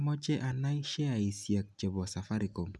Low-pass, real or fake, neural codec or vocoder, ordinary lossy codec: none; real; none; none